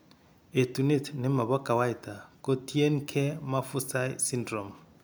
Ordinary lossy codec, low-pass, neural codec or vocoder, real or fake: none; none; none; real